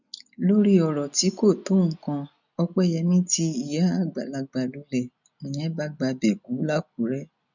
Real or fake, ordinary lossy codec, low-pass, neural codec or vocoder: real; none; 7.2 kHz; none